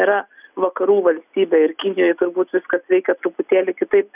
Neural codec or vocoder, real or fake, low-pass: none; real; 3.6 kHz